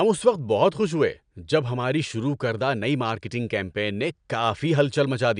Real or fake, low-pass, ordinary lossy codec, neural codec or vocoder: real; 9.9 kHz; none; none